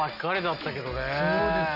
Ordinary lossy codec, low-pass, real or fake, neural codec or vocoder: none; 5.4 kHz; real; none